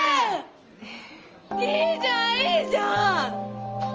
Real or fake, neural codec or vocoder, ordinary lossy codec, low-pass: real; none; Opus, 24 kbps; 7.2 kHz